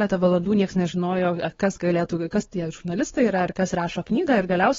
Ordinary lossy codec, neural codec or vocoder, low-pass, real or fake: AAC, 24 kbps; codec, 16 kHz, 4 kbps, X-Codec, WavLM features, trained on Multilingual LibriSpeech; 7.2 kHz; fake